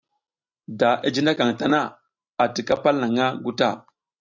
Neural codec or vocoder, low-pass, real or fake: none; 7.2 kHz; real